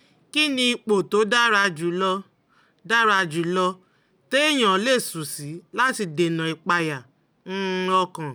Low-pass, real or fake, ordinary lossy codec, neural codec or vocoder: none; real; none; none